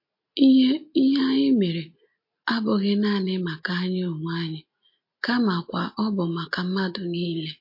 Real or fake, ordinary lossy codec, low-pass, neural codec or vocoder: real; MP3, 32 kbps; 5.4 kHz; none